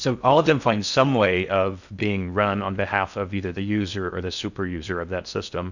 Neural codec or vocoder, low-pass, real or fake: codec, 16 kHz in and 24 kHz out, 0.6 kbps, FocalCodec, streaming, 4096 codes; 7.2 kHz; fake